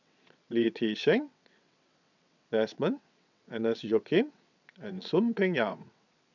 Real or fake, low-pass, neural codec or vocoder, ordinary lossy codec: fake; 7.2 kHz; vocoder, 22.05 kHz, 80 mel bands, WaveNeXt; none